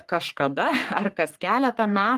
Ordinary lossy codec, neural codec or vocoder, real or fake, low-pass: Opus, 24 kbps; codec, 44.1 kHz, 3.4 kbps, Pupu-Codec; fake; 14.4 kHz